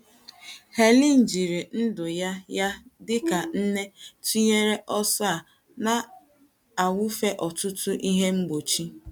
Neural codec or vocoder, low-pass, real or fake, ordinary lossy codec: none; none; real; none